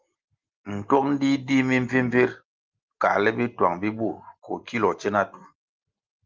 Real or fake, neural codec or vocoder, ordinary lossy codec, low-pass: real; none; Opus, 32 kbps; 7.2 kHz